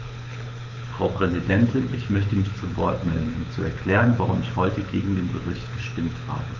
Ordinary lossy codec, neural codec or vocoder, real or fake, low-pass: none; codec, 24 kHz, 6 kbps, HILCodec; fake; 7.2 kHz